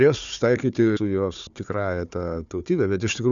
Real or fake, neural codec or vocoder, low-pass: fake; codec, 16 kHz, 4 kbps, FunCodec, trained on Chinese and English, 50 frames a second; 7.2 kHz